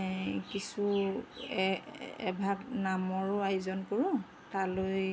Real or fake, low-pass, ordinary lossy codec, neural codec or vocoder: real; none; none; none